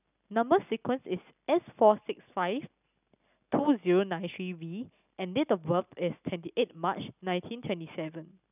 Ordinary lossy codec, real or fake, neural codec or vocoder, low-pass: none; real; none; 3.6 kHz